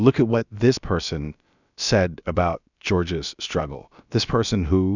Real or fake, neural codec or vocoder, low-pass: fake; codec, 16 kHz, about 1 kbps, DyCAST, with the encoder's durations; 7.2 kHz